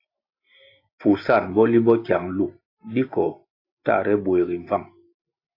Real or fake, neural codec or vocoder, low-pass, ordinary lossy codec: real; none; 5.4 kHz; AAC, 32 kbps